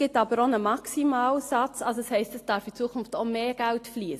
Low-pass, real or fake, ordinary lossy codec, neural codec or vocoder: 14.4 kHz; real; AAC, 48 kbps; none